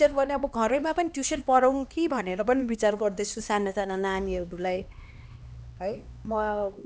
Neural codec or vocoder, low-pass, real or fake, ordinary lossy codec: codec, 16 kHz, 2 kbps, X-Codec, HuBERT features, trained on LibriSpeech; none; fake; none